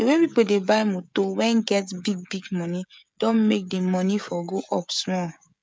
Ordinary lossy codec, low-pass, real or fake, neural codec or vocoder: none; none; fake; codec, 16 kHz, 16 kbps, FreqCodec, smaller model